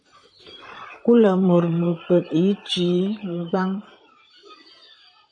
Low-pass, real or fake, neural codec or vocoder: 9.9 kHz; fake; vocoder, 44.1 kHz, 128 mel bands, Pupu-Vocoder